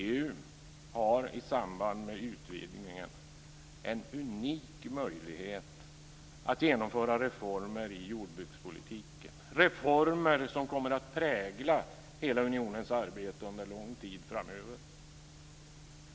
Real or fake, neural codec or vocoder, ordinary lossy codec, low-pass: real; none; none; none